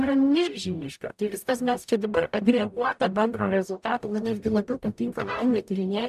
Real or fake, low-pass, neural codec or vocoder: fake; 14.4 kHz; codec, 44.1 kHz, 0.9 kbps, DAC